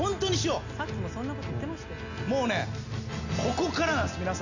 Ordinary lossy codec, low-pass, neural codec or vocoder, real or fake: none; 7.2 kHz; none; real